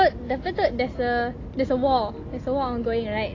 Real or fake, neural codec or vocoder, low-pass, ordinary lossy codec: real; none; 7.2 kHz; AAC, 32 kbps